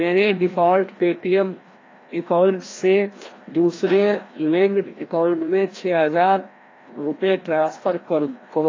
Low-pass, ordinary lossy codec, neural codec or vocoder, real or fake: 7.2 kHz; AAC, 32 kbps; codec, 16 kHz, 1 kbps, FreqCodec, larger model; fake